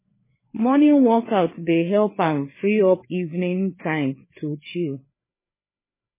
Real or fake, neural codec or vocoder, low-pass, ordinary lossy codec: fake; codec, 16 kHz, 4 kbps, FreqCodec, larger model; 3.6 kHz; MP3, 16 kbps